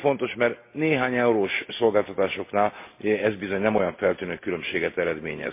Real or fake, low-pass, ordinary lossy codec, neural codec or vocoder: real; 3.6 kHz; MP3, 24 kbps; none